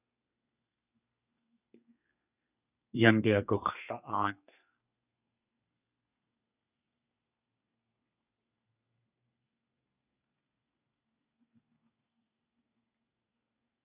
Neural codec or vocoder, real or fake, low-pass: codec, 32 kHz, 1.9 kbps, SNAC; fake; 3.6 kHz